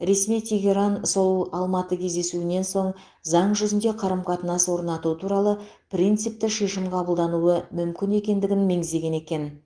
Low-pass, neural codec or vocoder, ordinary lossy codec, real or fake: 9.9 kHz; none; Opus, 24 kbps; real